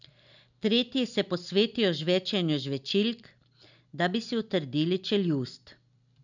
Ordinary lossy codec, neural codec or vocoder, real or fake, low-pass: none; none; real; 7.2 kHz